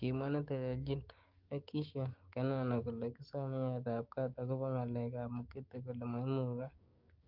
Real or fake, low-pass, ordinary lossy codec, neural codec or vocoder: fake; 5.4 kHz; Opus, 32 kbps; codec, 16 kHz, 6 kbps, DAC